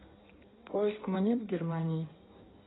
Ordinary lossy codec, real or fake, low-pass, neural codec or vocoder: AAC, 16 kbps; fake; 7.2 kHz; codec, 16 kHz in and 24 kHz out, 1.1 kbps, FireRedTTS-2 codec